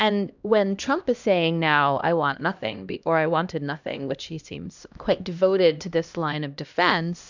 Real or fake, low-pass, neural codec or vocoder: fake; 7.2 kHz; codec, 16 kHz, 1 kbps, X-Codec, HuBERT features, trained on LibriSpeech